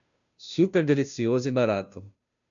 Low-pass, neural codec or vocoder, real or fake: 7.2 kHz; codec, 16 kHz, 0.5 kbps, FunCodec, trained on Chinese and English, 25 frames a second; fake